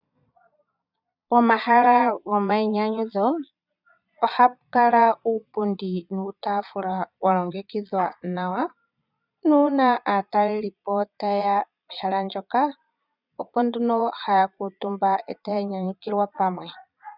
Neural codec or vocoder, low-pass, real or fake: vocoder, 22.05 kHz, 80 mel bands, Vocos; 5.4 kHz; fake